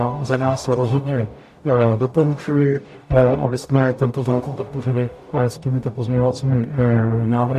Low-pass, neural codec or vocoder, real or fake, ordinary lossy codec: 14.4 kHz; codec, 44.1 kHz, 0.9 kbps, DAC; fake; AAC, 96 kbps